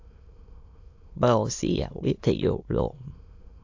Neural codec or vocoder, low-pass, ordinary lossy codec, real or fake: autoencoder, 22.05 kHz, a latent of 192 numbers a frame, VITS, trained on many speakers; 7.2 kHz; AAC, 48 kbps; fake